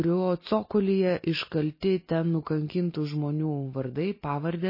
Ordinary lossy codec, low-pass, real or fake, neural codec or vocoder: MP3, 24 kbps; 5.4 kHz; real; none